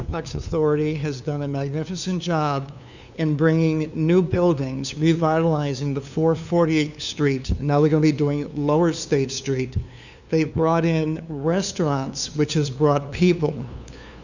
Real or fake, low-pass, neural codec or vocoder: fake; 7.2 kHz; codec, 16 kHz, 2 kbps, FunCodec, trained on LibriTTS, 25 frames a second